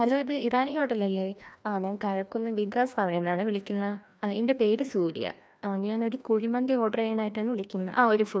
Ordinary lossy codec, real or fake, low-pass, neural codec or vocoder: none; fake; none; codec, 16 kHz, 1 kbps, FreqCodec, larger model